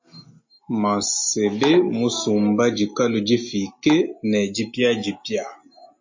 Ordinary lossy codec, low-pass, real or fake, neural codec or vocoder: MP3, 32 kbps; 7.2 kHz; real; none